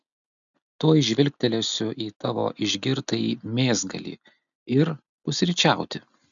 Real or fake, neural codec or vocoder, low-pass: real; none; 7.2 kHz